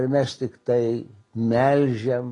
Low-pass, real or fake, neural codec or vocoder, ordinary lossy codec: 10.8 kHz; real; none; AAC, 32 kbps